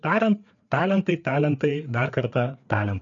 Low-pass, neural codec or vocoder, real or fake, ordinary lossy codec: 7.2 kHz; codec, 16 kHz, 4 kbps, FreqCodec, larger model; fake; MP3, 96 kbps